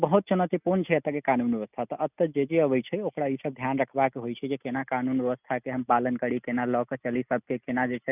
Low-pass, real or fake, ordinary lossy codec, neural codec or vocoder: 3.6 kHz; real; none; none